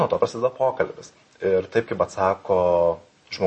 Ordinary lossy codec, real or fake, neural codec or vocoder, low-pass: MP3, 32 kbps; real; none; 10.8 kHz